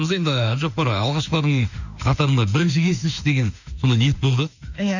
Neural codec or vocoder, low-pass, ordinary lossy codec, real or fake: autoencoder, 48 kHz, 32 numbers a frame, DAC-VAE, trained on Japanese speech; 7.2 kHz; none; fake